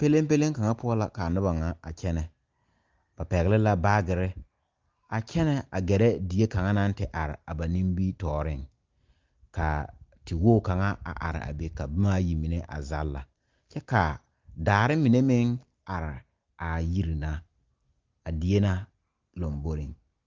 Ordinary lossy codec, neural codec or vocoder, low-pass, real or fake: Opus, 32 kbps; none; 7.2 kHz; real